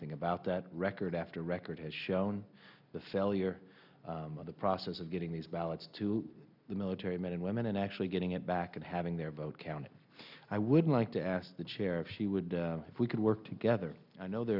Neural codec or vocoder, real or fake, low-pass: none; real; 5.4 kHz